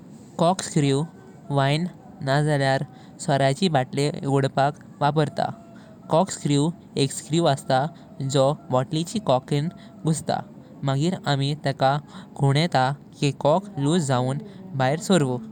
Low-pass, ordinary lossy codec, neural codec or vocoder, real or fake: 19.8 kHz; none; none; real